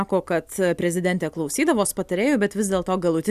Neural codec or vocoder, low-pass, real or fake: none; 14.4 kHz; real